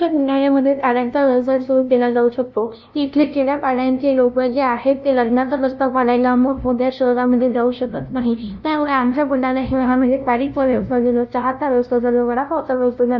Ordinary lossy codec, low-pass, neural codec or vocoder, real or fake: none; none; codec, 16 kHz, 0.5 kbps, FunCodec, trained on LibriTTS, 25 frames a second; fake